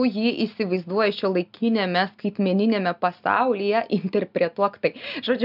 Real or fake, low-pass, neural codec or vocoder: real; 5.4 kHz; none